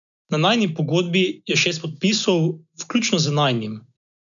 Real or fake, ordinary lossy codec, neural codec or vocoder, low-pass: real; none; none; 7.2 kHz